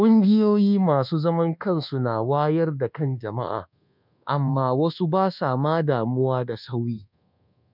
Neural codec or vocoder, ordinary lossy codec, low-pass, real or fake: codec, 24 kHz, 1.2 kbps, DualCodec; none; 5.4 kHz; fake